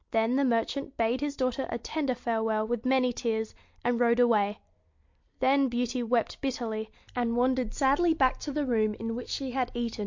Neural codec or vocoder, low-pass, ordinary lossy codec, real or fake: none; 7.2 kHz; MP3, 48 kbps; real